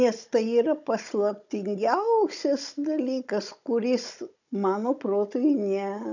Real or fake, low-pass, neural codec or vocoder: fake; 7.2 kHz; codec, 16 kHz, 16 kbps, FunCodec, trained on Chinese and English, 50 frames a second